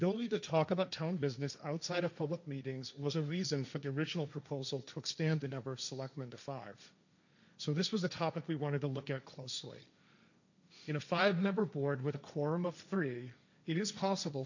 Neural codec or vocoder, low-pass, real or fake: codec, 16 kHz, 1.1 kbps, Voila-Tokenizer; 7.2 kHz; fake